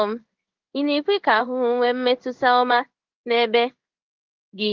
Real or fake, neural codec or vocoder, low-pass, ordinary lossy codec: fake; codec, 16 kHz in and 24 kHz out, 1 kbps, XY-Tokenizer; 7.2 kHz; Opus, 24 kbps